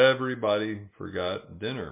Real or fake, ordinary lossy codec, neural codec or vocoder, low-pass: real; MP3, 32 kbps; none; 3.6 kHz